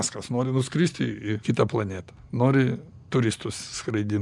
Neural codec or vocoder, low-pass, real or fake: none; 10.8 kHz; real